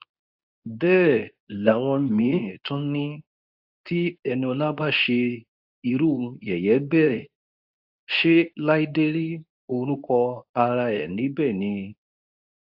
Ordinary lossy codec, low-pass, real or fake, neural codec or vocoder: AAC, 48 kbps; 5.4 kHz; fake; codec, 24 kHz, 0.9 kbps, WavTokenizer, medium speech release version 2